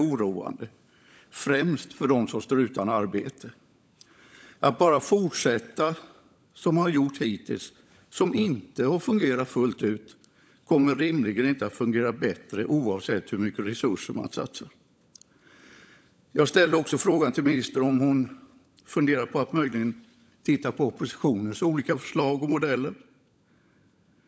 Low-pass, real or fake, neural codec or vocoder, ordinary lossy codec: none; fake; codec, 16 kHz, 16 kbps, FunCodec, trained on LibriTTS, 50 frames a second; none